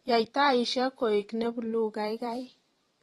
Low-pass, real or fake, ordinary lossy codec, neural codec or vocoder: 19.8 kHz; fake; AAC, 32 kbps; vocoder, 44.1 kHz, 128 mel bands every 512 samples, BigVGAN v2